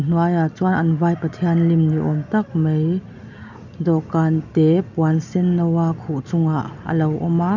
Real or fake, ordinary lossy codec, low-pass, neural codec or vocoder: real; none; 7.2 kHz; none